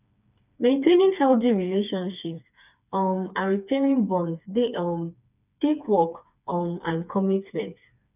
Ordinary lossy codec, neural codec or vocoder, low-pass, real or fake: none; codec, 16 kHz, 4 kbps, FreqCodec, smaller model; 3.6 kHz; fake